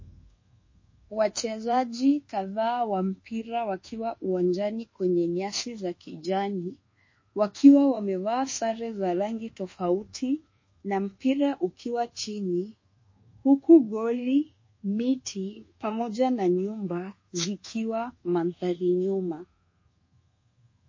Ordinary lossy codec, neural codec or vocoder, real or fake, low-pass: MP3, 32 kbps; codec, 24 kHz, 1.2 kbps, DualCodec; fake; 7.2 kHz